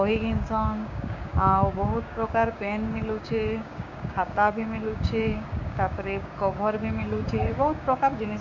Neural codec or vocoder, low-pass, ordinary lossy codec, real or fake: codec, 16 kHz, 6 kbps, DAC; 7.2 kHz; MP3, 48 kbps; fake